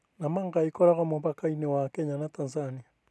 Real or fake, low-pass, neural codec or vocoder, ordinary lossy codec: real; none; none; none